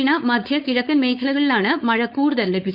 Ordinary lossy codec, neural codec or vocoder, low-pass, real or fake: Opus, 64 kbps; codec, 16 kHz, 4.8 kbps, FACodec; 5.4 kHz; fake